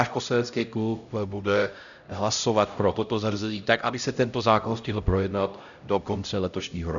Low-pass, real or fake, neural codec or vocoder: 7.2 kHz; fake; codec, 16 kHz, 0.5 kbps, X-Codec, HuBERT features, trained on LibriSpeech